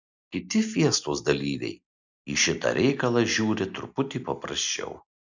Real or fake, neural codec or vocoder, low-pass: real; none; 7.2 kHz